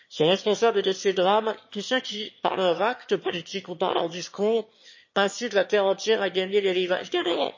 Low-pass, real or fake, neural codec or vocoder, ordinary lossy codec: 7.2 kHz; fake; autoencoder, 22.05 kHz, a latent of 192 numbers a frame, VITS, trained on one speaker; MP3, 32 kbps